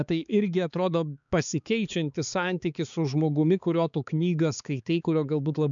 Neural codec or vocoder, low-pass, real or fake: codec, 16 kHz, 4 kbps, X-Codec, HuBERT features, trained on balanced general audio; 7.2 kHz; fake